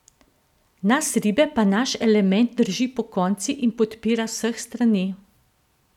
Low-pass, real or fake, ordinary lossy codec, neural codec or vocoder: 19.8 kHz; real; none; none